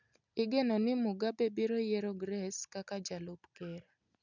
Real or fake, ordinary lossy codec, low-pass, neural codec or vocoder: real; none; 7.2 kHz; none